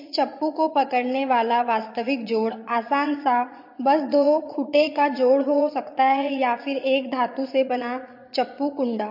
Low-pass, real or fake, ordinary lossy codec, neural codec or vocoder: 5.4 kHz; fake; MP3, 32 kbps; vocoder, 22.05 kHz, 80 mel bands, Vocos